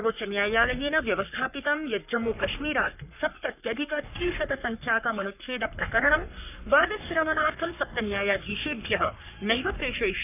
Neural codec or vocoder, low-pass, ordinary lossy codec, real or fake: codec, 44.1 kHz, 3.4 kbps, Pupu-Codec; 3.6 kHz; none; fake